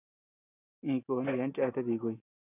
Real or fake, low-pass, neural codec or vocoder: real; 3.6 kHz; none